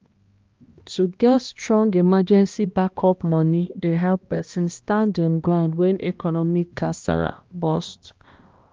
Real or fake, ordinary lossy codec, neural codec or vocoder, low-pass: fake; Opus, 32 kbps; codec, 16 kHz, 1 kbps, X-Codec, HuBERT features, trained on balanced general audio; 7.2 kHz